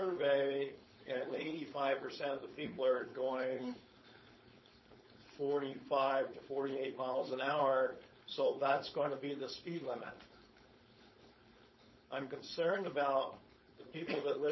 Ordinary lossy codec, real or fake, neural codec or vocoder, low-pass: MP3, 24 kbps; fake; codec, 16 kHz, 4.8 kbps, FACodec; 7.2 kHz